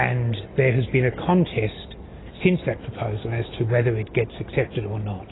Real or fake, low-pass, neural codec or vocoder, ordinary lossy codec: real; 7.2 kHz; none; AAC, 16 kbps